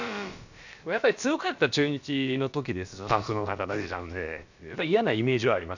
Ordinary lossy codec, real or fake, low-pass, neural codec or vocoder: none; fake; 7.2 kHz; codec, 16 kHz, about 1 kbps, DyCAST, with the encoder's durations